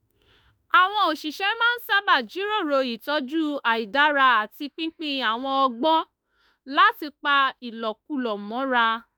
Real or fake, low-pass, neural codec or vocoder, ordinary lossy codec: fake; none; autoencoder, 48 kHz, 32 numbers a frame, DAC-VAE, trained on Japanese speech; none